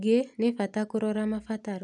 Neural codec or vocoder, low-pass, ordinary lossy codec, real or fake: none; 10.8 kHz; none; real